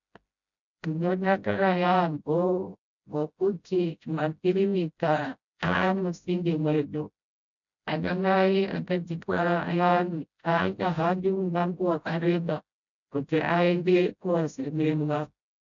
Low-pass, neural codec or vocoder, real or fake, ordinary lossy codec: 7.2 kHz; codec, 16 kHz, 0.5 kbps, FreqCodec, smaller model; fake; MP3, 96 kbps